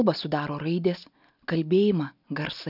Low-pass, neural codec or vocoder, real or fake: 5.4 kHz; none; real